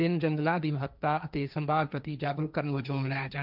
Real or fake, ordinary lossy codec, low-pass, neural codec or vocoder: fake; none; 5.4 kHz; codec, 16 kHz, 1.1 kbps, Voila-Tokenizer